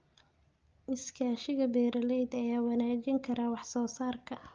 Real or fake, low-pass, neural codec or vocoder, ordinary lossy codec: real; 7.2 kHz; none; Opus, 24 kbps